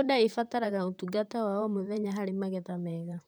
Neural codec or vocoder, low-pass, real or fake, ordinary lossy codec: vocoder, 44.1 kHz, 128 mel bands, Pupu-Vocoder; none; fake; none